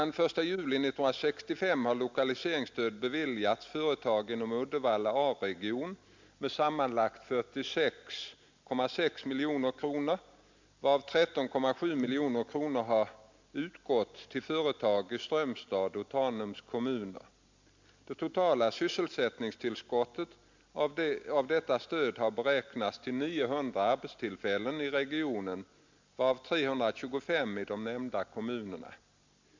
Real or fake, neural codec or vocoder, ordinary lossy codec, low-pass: real; none; MP3, 64 kbps; 7.2 kHz